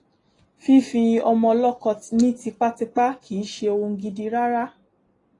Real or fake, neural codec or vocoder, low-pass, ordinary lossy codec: real; none; 10.8 kHz; AAC, 32 kbps